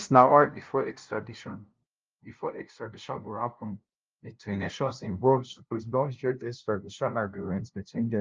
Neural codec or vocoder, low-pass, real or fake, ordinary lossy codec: codec, 16 kHz, 0.5 kbps, FunCodec, trained on LibriTTS, 25 frames a second; 7.2 kHz; fake; Opus, 24 kbps